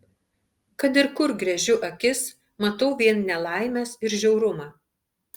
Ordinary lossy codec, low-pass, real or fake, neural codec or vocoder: Opus, 32 kbps; 19.8 kHz; real; none